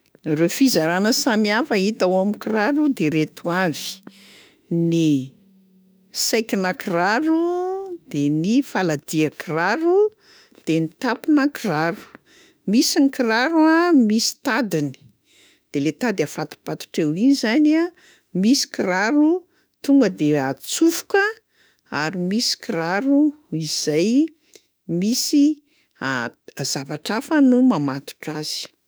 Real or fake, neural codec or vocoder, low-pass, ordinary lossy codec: fake; autoencoder, 48 kHz, 32 numbers a frame, DAC-VAE, trained on Japanese speech; none; none